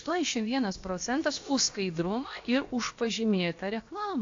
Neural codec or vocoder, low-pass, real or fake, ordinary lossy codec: codec, 16 kHz, about 1 kbps, DyCAST, with the encoder's durations; 7.2 kHz; fake; AAC, 48 kbps